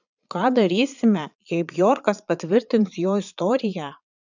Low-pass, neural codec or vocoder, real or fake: 7.2 kHz; vocoder, 22.05 kHz, 80 mel bands, Vocos; fake